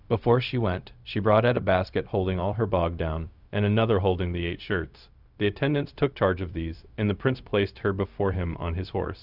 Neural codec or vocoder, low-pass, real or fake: codec, 16 kHz, 0.4 kbps, LongCat-Audio-Codec; 5.4 kHz; fake